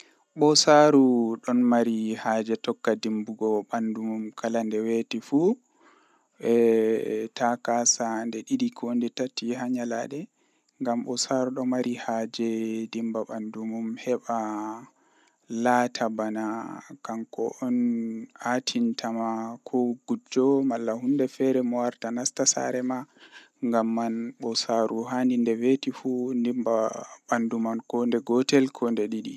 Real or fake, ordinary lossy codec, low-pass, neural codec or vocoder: real; none; 14.4 kHz; none